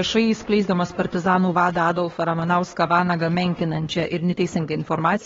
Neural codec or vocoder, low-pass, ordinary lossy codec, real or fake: codec, 16 kHz, 2 kbps, X-Codec, HuBERT features, trained on LibriSpeech; 7.2 kHz; AAC, 24 kbps; fake